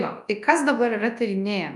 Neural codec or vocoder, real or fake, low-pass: codec, 24 kHz, 0.9 kbps, WavTokenizer, large speech release; fake; 10.8 kHz